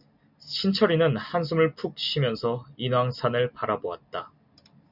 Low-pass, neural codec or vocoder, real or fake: 5.4 kHz; none; real